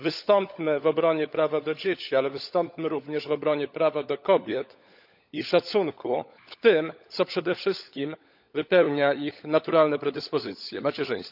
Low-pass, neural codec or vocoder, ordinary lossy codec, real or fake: 5.4 kHz; codec, 16 kHz, 16 kbps, FunCodec, trained on LibriTTS, 50 frames a second; none; fake